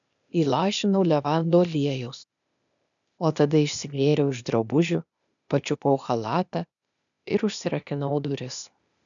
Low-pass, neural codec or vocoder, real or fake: 7.2 kHz; codec, 16 kHz, 0.8 kbps, ZipCodec; fake